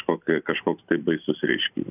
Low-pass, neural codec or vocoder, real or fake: 3.6 kHz; none; real